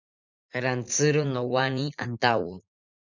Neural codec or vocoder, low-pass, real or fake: vocoder, 44.1 kHz, 80 mel bands, Vocos; 7.2 kHz; fake